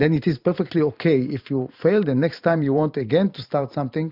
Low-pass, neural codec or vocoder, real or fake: 5.4 kHz; vocoder, 44.1 kHz, 128 mel bands every 512 samples, BigVGAN v2; fake